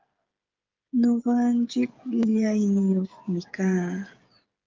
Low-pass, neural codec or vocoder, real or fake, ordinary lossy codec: 7.2 kHz; codec, 16 kHz, 8 kbps, FreqCodec, smaller model; fake; Opus, 32 kbps